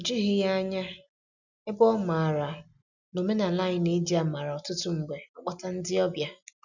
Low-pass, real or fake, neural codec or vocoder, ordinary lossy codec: 7.2 kHz; real; none; none